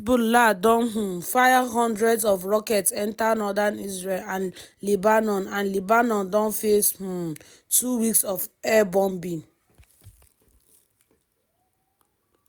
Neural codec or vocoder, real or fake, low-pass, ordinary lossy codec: none; real; none; none